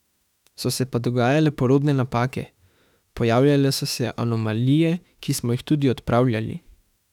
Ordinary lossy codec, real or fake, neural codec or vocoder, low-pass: none; fake; autoencoder, 48 kHz, 32 numbers a frame, DAC-VAE, trained on Japanese speech; 19.8 kHz